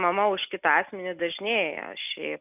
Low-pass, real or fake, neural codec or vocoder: 3.6 kHz; real; none